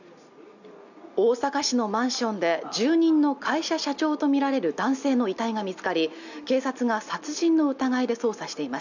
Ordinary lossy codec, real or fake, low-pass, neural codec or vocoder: none; real; 7.2 kHz; none